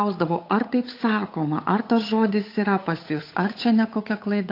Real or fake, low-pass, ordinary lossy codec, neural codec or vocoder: fake; 5.4 kHz; AAC, 32 kbps; codec, 16 kHz, 8 kbps, FunCodec, trained on Chinese and English, 25 frames a second